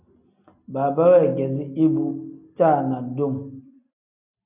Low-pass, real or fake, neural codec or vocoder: 3.6 kHz; real; none